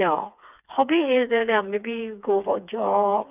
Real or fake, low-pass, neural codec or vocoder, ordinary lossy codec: fake; 3.6 kHz; codec, 16 kHz, 4 kbps, FreqCodec, smaller model; none